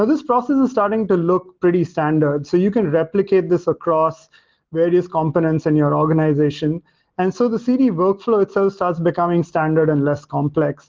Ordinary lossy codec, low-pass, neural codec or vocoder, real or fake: Opus, 16 kbps; 7.2 kHz; none; real